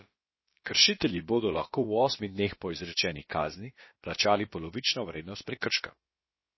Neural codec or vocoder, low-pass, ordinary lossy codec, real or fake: codec, 16 kHz, about 1 kbps, DyCAST, with the encoder's durations; 7.2 kHz; MP3, 24 kbps; fake